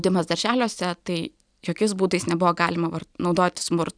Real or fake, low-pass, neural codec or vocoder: real; 9.9 kHz; none